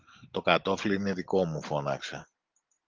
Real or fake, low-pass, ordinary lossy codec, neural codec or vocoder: real; 7.2 kHz; Opus, 32 kbps; none